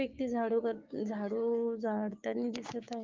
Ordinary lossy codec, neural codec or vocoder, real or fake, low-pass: Opus, 32 kbps; codec, 16 kHz, 6 kbps, DAC; fake; 7.2 kHz